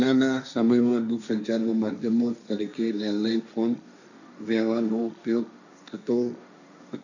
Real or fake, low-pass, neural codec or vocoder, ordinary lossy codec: fake; 7.2 kHz; codec, 16 kHz, 1.1 kbps, Voila-Tokenizer; none